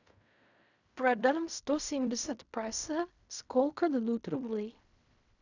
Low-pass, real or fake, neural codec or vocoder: 7.2 kHz; fake; codec, 16 kHz in and 24 kHz out, 0.4 kbps, LongCat-Audio-Codec, fine tuned four codebook decoder